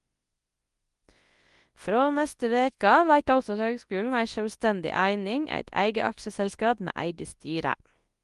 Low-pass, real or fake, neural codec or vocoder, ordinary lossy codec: 10.8 kHz; fake; codec, 24 kHz, 0.9 kbps, WavTokenizer, large speech release; Opus, 24 kbps